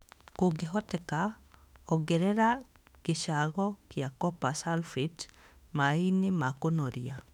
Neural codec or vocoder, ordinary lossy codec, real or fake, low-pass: autoencoder, 48 kHz, 32 numbers a frame, DAC-VAE, trained on Japanese speech; none; fake; 19.8 kHz